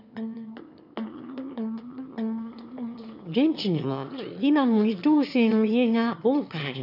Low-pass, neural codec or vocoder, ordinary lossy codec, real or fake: 5.4 kHz; autoencoder, 22.05 kHz, a latent of 192 numbers a frame, VITS, trained on one speaker; none; fake